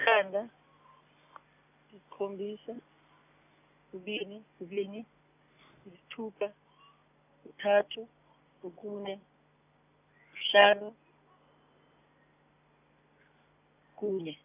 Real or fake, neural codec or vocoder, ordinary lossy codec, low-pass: real; none; none; 3.6 kHz